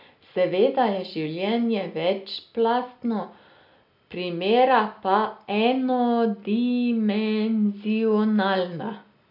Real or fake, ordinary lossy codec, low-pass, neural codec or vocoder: real; none; 5.4 kHz; none